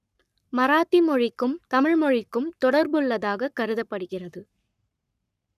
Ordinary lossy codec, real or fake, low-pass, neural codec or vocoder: none; fake; 14.4 kHz; codec, 44.1 kHz, 7.8 kbps, Pupu-Codec